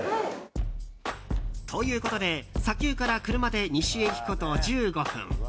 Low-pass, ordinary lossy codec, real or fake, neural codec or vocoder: none; none; real; none